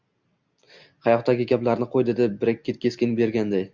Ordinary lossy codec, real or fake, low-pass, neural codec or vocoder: Opus, 64 kbps; real; 7.2 kHz; none